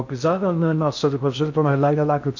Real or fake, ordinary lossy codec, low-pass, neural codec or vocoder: fake; none; 7.2 kHz; codec, 16 kHz in and 24 kHz out, 0.6 kbps, FocalCodec, streaming, 2048 codes